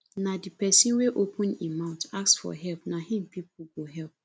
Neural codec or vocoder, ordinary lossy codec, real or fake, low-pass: none; none; real; none